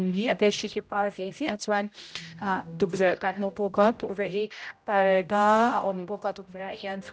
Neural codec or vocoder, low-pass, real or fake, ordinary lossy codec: codec, 16 kHz, 0.5 kbps, X-Codec, HuBERT features, trained on general audio; none; fake; none